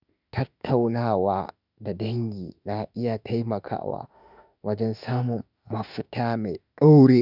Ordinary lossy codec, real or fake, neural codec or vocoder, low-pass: none; fake; autoencoder, 48 kHz, 32 numbers a frame, DAC-VAE, trained on Japanese speech; 5.4 kHz